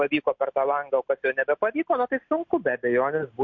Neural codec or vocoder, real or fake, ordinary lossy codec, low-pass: none; real; MP3, 48 kbps; 7.2 kHz